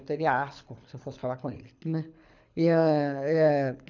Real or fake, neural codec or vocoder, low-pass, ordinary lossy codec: fake; codec, 24 kHz, 6 kbps, HILCodec; 7.2 kHz; none